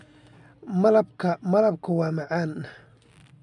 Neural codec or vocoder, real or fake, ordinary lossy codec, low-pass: none; real; none; 10.8 kHz